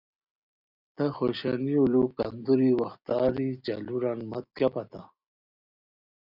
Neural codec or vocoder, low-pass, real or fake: none; 5.4 kHz; real